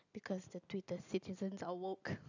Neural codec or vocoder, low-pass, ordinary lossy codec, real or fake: none; 7.2 kHz; none; real